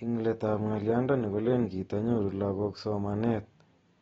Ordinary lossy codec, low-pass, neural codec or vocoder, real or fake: AAC, 24 kbps; 19.8 kHz; none; real